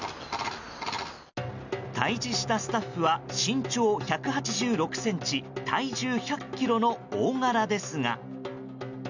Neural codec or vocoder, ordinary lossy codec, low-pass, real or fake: none; none; 7.2 kHz; real